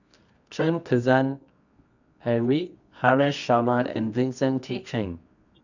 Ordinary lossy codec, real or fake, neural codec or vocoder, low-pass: none; fake; codec, 24 kHz, 0.9 kbps, WavTokenizer, medium music audio release; 7.2 kHz